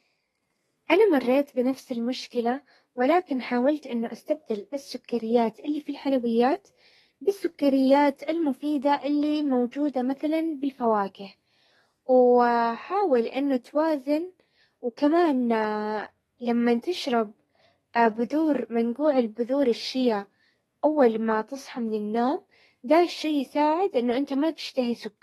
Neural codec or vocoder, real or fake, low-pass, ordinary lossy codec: codec, 32 kHz, 1.9 kbps, SNAC; fake; 14.4 kHz; AAC, 32 kbps